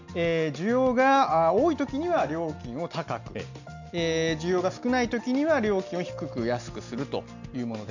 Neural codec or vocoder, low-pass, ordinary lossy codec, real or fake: none; 7.2 kHz; none; real